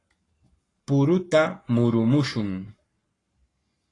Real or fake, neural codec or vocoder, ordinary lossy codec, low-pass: fake; codec, 44.1 kHz, 7.8 kbps, Pupu-Codec; AAC, 32 kbps; 10.8 kHz